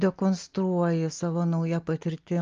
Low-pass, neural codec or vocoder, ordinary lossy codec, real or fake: 7.2 kHz; none; Opus, 24 kbps; real